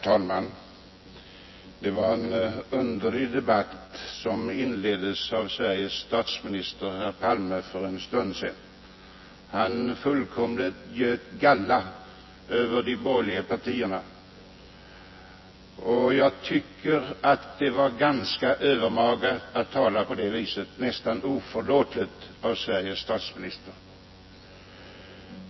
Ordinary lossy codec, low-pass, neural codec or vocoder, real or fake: MP3, 24 kbps; 7.2 kHz; vocoder, 24 kHz, 100 mel bands, Vocos; fake